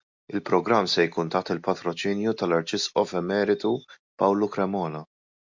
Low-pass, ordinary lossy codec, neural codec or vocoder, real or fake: 7.2 kHz; MP3, 64 kbps; none; real